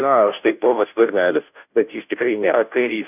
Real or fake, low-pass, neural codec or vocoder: fake; 3.6 kHz; codec, 16 kHz, 0.5 kbps, FunCodec, trained on Chinese and English, 25 frames a second